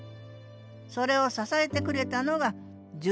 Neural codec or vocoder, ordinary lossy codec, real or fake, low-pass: none; none; real; none